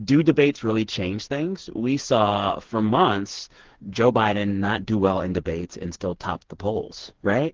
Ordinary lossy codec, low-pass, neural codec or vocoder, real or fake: Opus, 16 kbps; 7.2 kHz; codec, 16 kHz, 4 kbps, FreqCodec, smaller model; fake